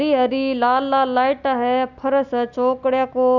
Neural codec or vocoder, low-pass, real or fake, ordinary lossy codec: none; 7.2 kHz; real; none